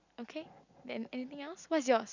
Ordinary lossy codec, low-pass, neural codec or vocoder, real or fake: none; 7.2 kHz; none; real